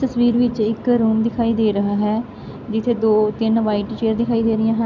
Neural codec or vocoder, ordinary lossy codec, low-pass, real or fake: none; none; 7.2 kHz; real